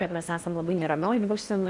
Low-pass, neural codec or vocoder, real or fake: 10.8 kHz; codec, 16 kHz in and 24 kHz out, 0.8 kbps, FocalCodec, streaming, 65536 codes; fake